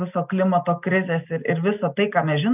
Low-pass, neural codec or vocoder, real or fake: 3.6 kHz; none; real